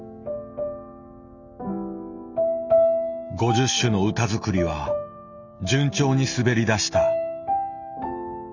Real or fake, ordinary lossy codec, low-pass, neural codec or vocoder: real; none; 7.2 kHz; none